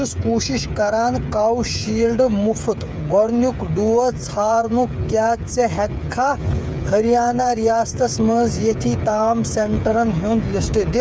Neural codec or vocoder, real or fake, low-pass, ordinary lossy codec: codec, 16 kHz, 8 kbps, FreqCodec, smaller model; fake; none; none